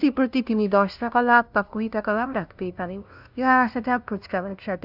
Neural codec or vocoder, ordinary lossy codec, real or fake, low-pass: codec, 16 kHz, 0.5 kbps, FunCodec, trained on LibriTTS, 25 frames a second; none; fake; 5.4 kHz